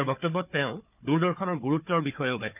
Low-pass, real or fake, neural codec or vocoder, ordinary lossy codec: 3.6 kHz; fake; codec, 16 kHz, 4 kbps, FunCodec, trained on Chinese and English, 50 frames a second; none